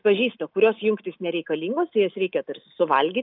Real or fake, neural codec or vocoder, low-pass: real; none; 5.4 kHz